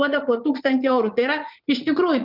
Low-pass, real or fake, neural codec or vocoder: 5.4 kHz; fake; vocoder, 44.1 kHz, 80 mel bands, Vocos